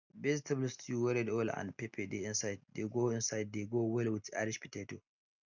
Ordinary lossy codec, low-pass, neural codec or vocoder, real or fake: none; 7.2 kHz; none; real